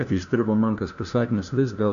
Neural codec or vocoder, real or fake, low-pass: codec, 16 kHz, 1 kbps, FunCodec, trained on LibriTTS, 50 frames a second; fake; 7.2 kHz